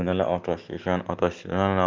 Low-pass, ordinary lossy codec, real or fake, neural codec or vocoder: 7.2 kHz; Opus, 24 kbps; real; none